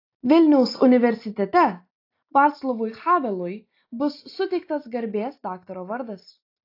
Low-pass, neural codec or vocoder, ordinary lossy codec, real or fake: 5.4 kHz; none; AAC, 32 kbps; real